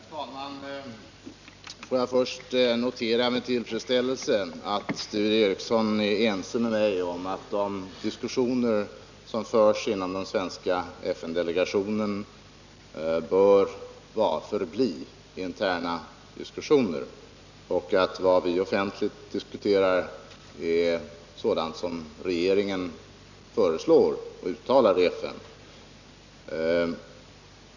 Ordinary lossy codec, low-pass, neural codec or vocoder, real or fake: none; 7.2 kHz; none; real